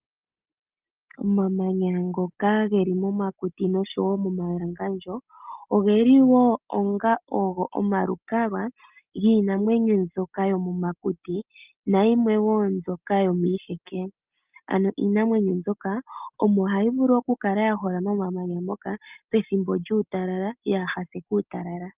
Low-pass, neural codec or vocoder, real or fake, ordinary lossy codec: 3.6 kHz; none; real; Opus, 24 kbps